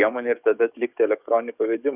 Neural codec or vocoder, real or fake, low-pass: codec, 44.1 kHz, 7.8 kbps, DAC; fake; 3.6 kHz